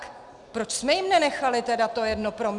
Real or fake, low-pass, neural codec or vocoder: real; 10.8 kHz; none